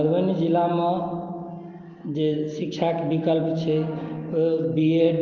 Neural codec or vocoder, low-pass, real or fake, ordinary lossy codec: none; 7.2 kHz; real; Opus, 32 kbps